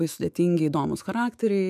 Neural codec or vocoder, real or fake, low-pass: autoencoder, 48 kHz, 128 numbers a frame, DAC-VAE, trained on Japanese speech; fake; 14.4 kHz